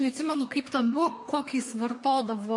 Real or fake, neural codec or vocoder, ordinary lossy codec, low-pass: fake; codec, 24 kHz, 1 kbps, SNAC; MP3, 48 kbps; 10.8 kHz